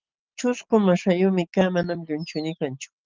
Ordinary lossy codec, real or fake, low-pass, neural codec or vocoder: Opus, 32 kbps; real; 7.2 kHz; none